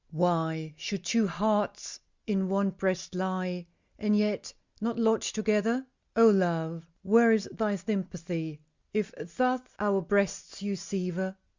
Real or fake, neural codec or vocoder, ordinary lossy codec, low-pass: real; none; Opus, 64 kbps; 7.2 kHz